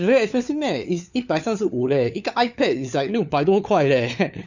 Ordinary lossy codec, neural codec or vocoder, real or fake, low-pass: none; codec, 16 kHz, 4 kbps, FunCodec, trained on LibriTTS, 50 frames a second; fake; 7.2 kHz